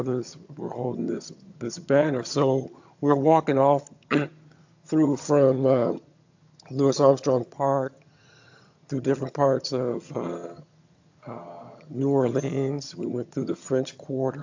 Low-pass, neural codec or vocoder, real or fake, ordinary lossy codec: 7.2 kHz; vocoder, 22.05 kHz, 80 mel bands, HiFi-GAN; fake; AAC, 48 kbps